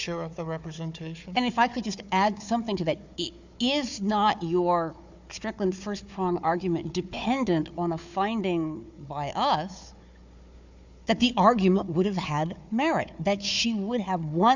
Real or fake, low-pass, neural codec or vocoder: fake; 7.2 kHz; codec, 16 kHz, 4 kbps, FunCodec, trained on Chinese and English, 50 frames a second